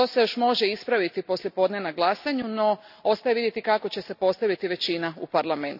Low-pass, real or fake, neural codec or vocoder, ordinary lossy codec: 5.4 kHz; real; none; none